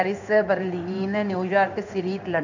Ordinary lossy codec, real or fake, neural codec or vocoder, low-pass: none; fake; codec, 16 kHz in and 24 kHz out, 1 kbps, XY-Tokenizer; 7.2 kHz